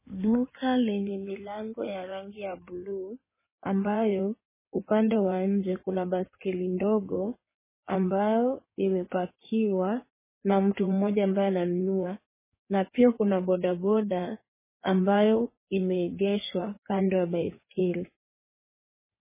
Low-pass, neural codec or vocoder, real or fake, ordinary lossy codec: 3.6 kHz; codec, 16 kHz in and 24 kHz out, 2.2 kbps, FireRedTTS-2 codec; fake; MP3, 16 kbps